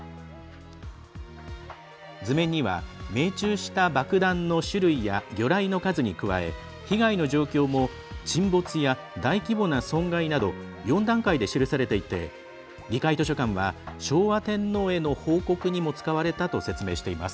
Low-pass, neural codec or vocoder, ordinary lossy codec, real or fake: none; none; none; real